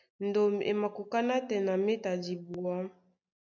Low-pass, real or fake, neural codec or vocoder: 7.2 kHz; real; none